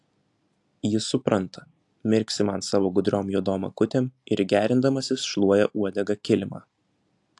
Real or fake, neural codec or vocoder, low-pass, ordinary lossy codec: real; none; 10.8 kHz; AAC, 64 kbps